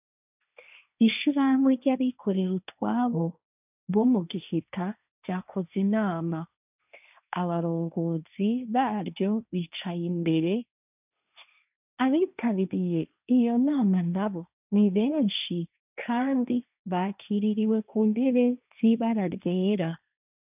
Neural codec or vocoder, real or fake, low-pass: codec, 16 kHz, 1.1 kbps, Voila-Tokenizer; fake; 3.6 kHz